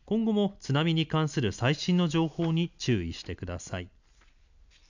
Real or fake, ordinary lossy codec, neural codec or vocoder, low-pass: real; none; none; 7.2 kHz